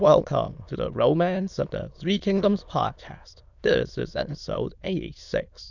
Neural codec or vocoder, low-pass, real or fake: autoencoder, 22.05 kHz, a latent of 192 numbers a frame, VITS, trained on many speakers; 7.2 kHz; fake